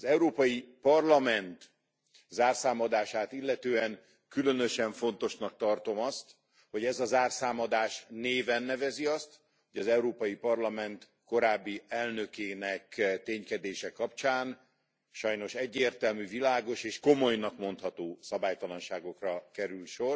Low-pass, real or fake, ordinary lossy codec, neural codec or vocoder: none; real; none; none